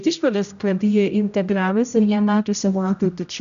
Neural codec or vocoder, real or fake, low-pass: codec, 16 kHz, 0.5 kbps, X-Codec, HuBERT features, trained on general audio; fake; 7.2 kHz